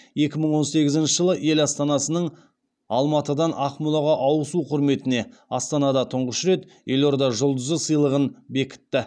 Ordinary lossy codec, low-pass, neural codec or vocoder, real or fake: none; 9.9 kHz; none; real